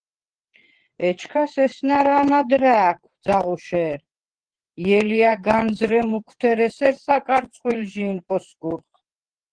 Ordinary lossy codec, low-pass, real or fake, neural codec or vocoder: Opus, 16 kbps; 9.9 kHz; fake; vocoder, 22.05 kHz, 80 mel bands, WaveNeXt